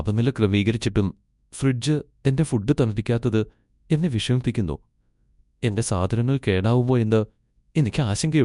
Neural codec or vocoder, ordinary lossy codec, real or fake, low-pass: codec, 24 kHz, 0.9 kbps, WavTokenizer, large speech release; none; fake; 10.8 kHz